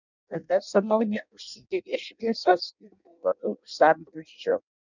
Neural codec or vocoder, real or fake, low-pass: codec, 16 kHz in and 24 kHz out, 0.6 kbps, FireRedTTS-2 codec; fake; 7.2 kHz